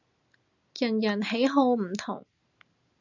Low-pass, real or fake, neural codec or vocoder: 7.2 kHz; real; none